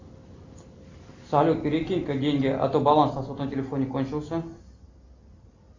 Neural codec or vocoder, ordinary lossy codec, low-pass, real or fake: none; AAC, 48 kbps; 7.2 kHz; real